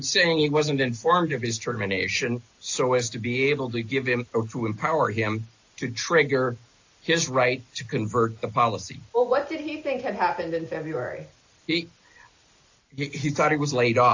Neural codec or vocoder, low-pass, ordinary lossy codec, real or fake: none; 7.2 kHz; AAC, 48 kbps; real